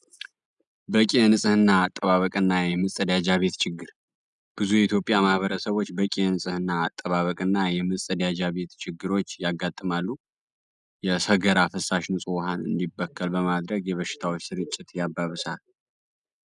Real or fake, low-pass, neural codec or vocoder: real; 10.8 kHz; none